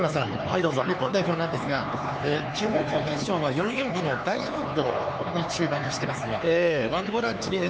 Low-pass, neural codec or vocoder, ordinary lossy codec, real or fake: none; codec, 16 kHz, 4 kbps, X-Codec, HuBERT features, trained on LibriSpeech; none; fake